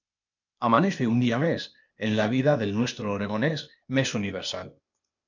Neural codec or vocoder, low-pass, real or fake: codec, 16 kHz, 0.8 kbps, ZipCodec; 7.2 kHz; fake